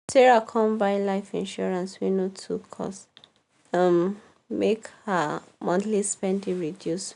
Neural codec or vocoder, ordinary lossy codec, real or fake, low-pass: none; none; real; 10.8 kHz